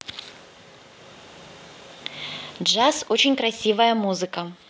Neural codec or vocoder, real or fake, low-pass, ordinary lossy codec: none; real; none; none